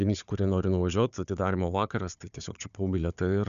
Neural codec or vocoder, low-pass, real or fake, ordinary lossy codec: codec, 16 kHz, 4 kbps, FunCodec, trained on Chinese and English, 50 frames a second; 7.2 kHz; fake; MP3, 96 kbps